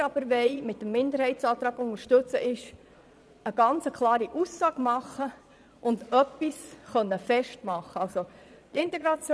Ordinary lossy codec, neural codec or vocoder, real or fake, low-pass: none; vocoder, 22.05 kHz, 80 mel bands, Vocos; fake; none